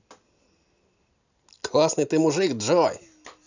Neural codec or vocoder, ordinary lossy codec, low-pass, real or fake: none; none; 7.2 kHz; real